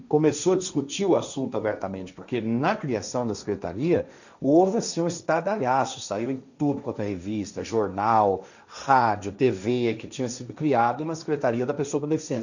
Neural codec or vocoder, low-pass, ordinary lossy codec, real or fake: codec, 16 kHz, 1.1 kbps, Voila-Tokenizer; 7.2 kHz; none; fake